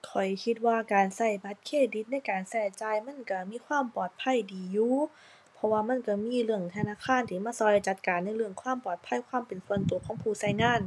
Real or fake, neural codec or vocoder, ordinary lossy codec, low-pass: real; none; none; none